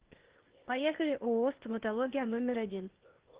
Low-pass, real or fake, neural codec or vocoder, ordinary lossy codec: 3.6 kHz; fake; codec, 16 kHz, 0.8 kbps, ZipCodec; Opus, 16 kbps